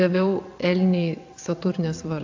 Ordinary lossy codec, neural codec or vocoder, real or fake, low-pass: AAC, 48 kbps; vocoder, 22.05 kHz, 80 mel bands, WaveNeXt; fake; 7.2 kHz